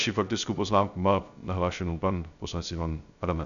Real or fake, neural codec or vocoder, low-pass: fake; codec, 16 kHz, 0.3 kbps, FocalCodec; 7.2 kHz